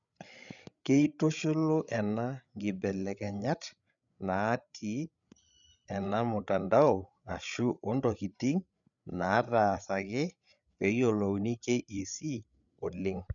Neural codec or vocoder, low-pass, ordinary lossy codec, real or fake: codec, 16 kHz, 8 kbps, FreqCodec, larger model; 7.2 kHz; none; fake